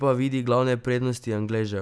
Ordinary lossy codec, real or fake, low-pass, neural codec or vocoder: none; real; none; none